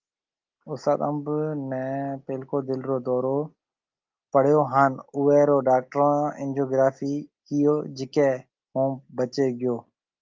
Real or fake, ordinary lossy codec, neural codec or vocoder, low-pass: real; Opus, 24 kbps; none; 7.2 kHz